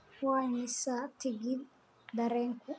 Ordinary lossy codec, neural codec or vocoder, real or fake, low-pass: none; none; real; none